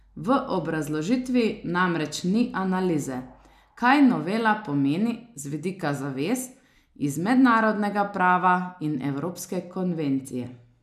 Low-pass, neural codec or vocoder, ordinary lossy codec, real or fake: 14.4 kHz; none; none; real